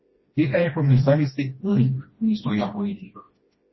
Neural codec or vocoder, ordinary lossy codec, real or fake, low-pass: codec, 16 kHz, 1 kbps, FreqCodec, smaller model; MP3, 24 kbps; fake; 7.2 kHz